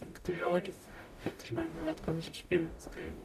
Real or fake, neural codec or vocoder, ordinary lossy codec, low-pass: fake; codec, 44.1 kHz, 0.9 kbps, DAC; MP3, 96 kbps; 14.4 kHz